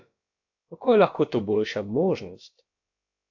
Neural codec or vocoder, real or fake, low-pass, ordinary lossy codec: codec, 16 kHz, about 1 kbps, DyCAST, with the encoder's durations; fake; 7.2 kHz; AAC, 48 kbps